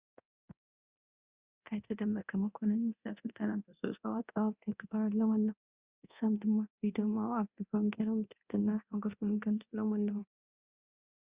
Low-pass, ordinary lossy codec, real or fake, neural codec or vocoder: 3.6 kHz; Opus, 64 kbps; fake; codec, 24 kHz, 0.9 kbps, DualCodec